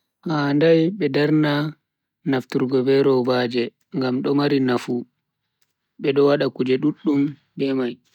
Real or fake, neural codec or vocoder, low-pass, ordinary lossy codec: real; none; 19.8 kHz; none